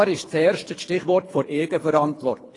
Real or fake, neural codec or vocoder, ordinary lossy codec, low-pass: fake; codec, 24 kHz, 3 kbps, HILCodec; AAC, 32 kbps; 10.8 kHz